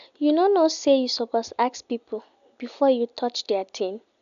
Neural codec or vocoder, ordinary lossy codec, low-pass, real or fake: none; none; 7.2 kHz; real